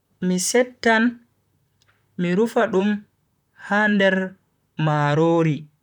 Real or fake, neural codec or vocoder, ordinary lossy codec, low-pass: fake; vocoder, 44.1 kHz, 128 mel bands, Pupu-Vocoder; none; 19.8 kHz